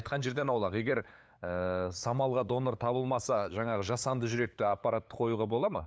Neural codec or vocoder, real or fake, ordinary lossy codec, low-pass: codec, 16 kHz, 8 kbps, FunCodec, trained on LibriTTS, 25 frames a second; fake; none; none